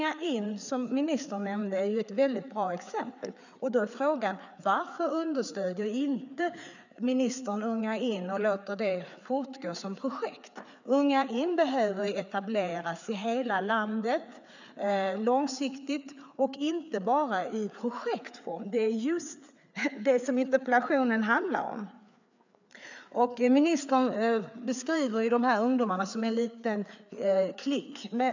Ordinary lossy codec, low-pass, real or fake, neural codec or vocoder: none; 7.2 kHz; fake; codec, 16 kHz, 4 kbps, FreqCodec, larger model